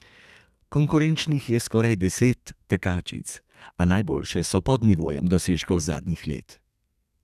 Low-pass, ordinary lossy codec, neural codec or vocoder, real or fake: 14.4 kHz; none; codec, 32 kHz, 1.9 kbps, SNAC; fake